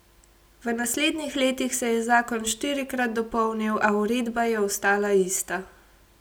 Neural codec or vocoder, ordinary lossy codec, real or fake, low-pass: none; none; real; none